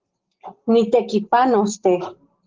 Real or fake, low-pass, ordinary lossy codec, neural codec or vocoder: real; 7.2 kHz; Opus, 16 kbps; none